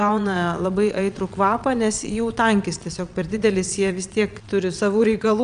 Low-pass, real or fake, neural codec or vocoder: 9.9 kHz; fake; vocoder, 22.05 kHz, 80 mel bands, Vocos